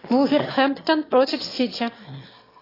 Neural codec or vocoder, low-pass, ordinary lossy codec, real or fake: autoencoder, 22.05 kHz, a latent of 192 numbers a frame, VITS, trained on one speaker; 5.4 kHz; AAC, 24 kbps; fake